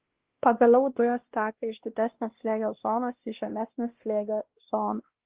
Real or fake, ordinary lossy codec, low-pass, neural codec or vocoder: fake; Opus, 16 kbps; 3.6 kHz; codec, 16 kHz, 1 kbps, X-Codec, WavLM features, trained on Multilingual LibriSpeech